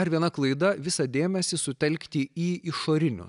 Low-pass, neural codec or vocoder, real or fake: 10.8 kHz; none; real